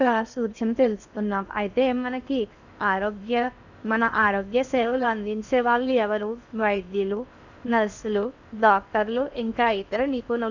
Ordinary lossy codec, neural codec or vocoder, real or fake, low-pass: none; codec, 16 kHz in and 24 kHz out, 0.6 kbps, FocalCodec, streaming, 4096 codes; fake; 7.2 kHz